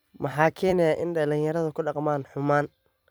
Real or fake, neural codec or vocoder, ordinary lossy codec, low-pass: fake; vocoder, 44.1 kHz, 128 mel bands every 256 samples, BigVGAN v2; none; none